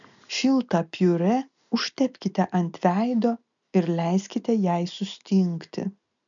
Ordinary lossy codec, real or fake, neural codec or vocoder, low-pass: MP3, 96 kbps; real; none; 7.2 kHz